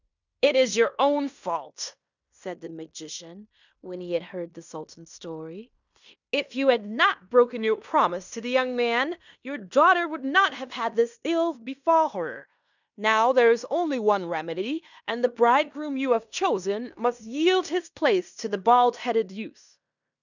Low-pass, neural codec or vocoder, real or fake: 7.2 kHz; codec, 16 kHz in and 24 kHz out, 0.9 kbps, LongCat-Audio-Codec, fine tuned four codebook decoder; fake